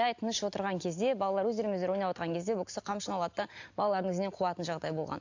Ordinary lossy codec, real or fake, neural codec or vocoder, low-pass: AAC, 48 kbps; real; none; 7.2 kHz